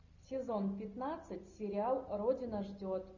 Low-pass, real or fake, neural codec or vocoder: 7.2 kHz; real; none